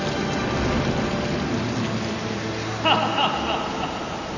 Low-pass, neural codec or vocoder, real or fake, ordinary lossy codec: 7.2 kHz; none; real; none